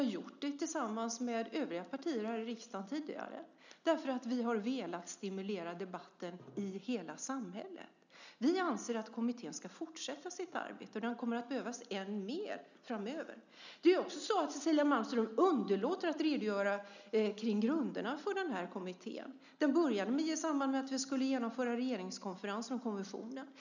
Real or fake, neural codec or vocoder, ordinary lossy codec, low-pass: real; none; none; 7.2 kHz